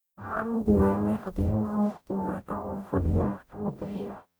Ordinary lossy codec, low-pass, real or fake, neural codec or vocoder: none; none; fake; codec, 44.1 kHz, 0.9 kbps, DAC